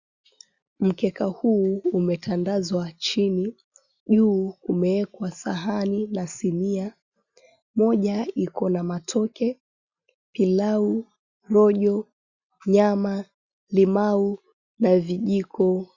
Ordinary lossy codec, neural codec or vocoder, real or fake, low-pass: Opus, 64 kbps; none; real; 7.2 kHz